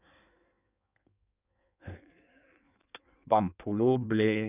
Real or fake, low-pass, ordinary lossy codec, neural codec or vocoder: fake; 3.6 kHz; none; codec, 16 kHz in and 24 kHz out, 1.1 kbps, FireRedTTS-2 codec